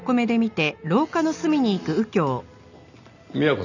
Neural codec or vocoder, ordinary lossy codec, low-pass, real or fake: none; none; 7.2 kHz; real